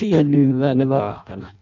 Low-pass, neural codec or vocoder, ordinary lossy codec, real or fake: 7.2 kHz; codec, 16 kHz in and 24 kHz out, 0.6 kbps, FireRedTTS-2 codec; none; fake